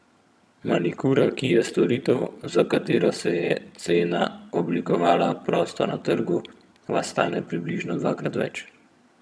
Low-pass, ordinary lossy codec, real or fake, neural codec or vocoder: none; none; fake; vocoder, 22.05 kHz, 80 mel bands, HiFi-GAN